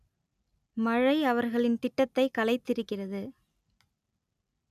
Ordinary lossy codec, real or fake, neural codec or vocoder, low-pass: none; real; none; 14.4 kHz